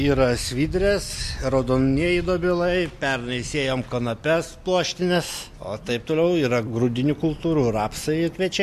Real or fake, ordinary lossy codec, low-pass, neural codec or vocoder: real; MP3, 64 kbps; 14.4 kHz; none